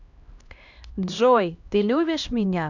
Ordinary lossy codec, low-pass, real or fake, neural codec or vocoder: none; 7.2 kHz; fake; codec, 16 kHz, 1 kbps, X-Codec, HuBERT features, trained on LibriSpeech